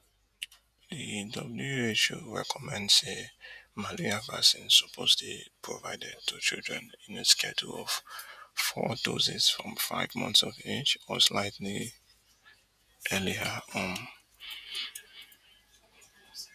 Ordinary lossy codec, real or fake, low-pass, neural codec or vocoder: none; fake; 14.4 kHz; vocoder, 48 kHz, 128 mel bands, Vocos